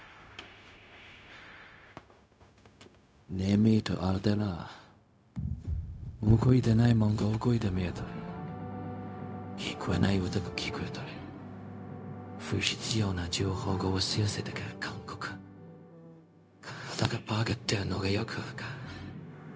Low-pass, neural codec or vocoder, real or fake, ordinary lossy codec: none; codec, 16 kHz, 0.4 kbps, LongCat-Audio-Codec; fake; none